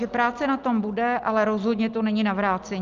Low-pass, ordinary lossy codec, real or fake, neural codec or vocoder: 7.2 kHz; Opus, 32 kbps; real; none